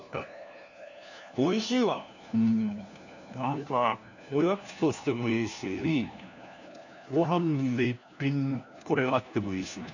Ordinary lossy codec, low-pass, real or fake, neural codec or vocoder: none; 7.2 kHz; fake; codec, 16 kHz, 1 kbps, FunCodec, trained on LibriTTS, 50 frames a second